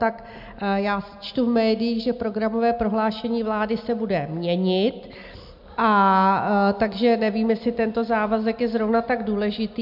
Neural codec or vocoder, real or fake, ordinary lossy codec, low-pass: none; real; MP3, 48 kbps; 5.4 kHz